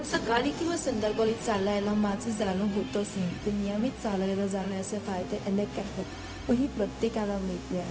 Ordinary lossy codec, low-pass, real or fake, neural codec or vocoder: none; none; fake; codec, 16 kHz, 0.4 kbps, LongCat-Audio-Codec